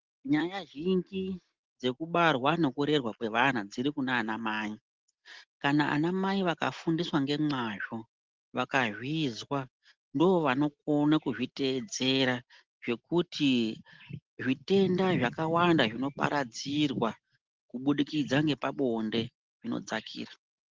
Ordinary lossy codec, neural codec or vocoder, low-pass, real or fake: Opus, 16 kbps; none; 7.2 kHz; real